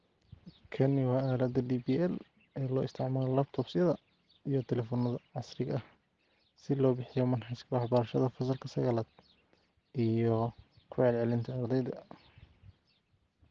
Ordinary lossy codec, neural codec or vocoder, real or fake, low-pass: Opus, 16 kbps; none; real; 7.2 kHz